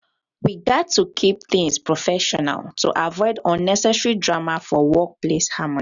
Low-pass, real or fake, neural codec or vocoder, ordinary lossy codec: 7.2 kHz; real; none; none